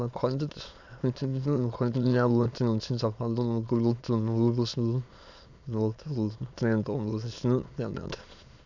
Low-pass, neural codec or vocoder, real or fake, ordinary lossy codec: 7.2 kHz; autoencoder, 22.05 kHz, a latent of 192 numbers a frame, VITS, trained on many speakers; fake; none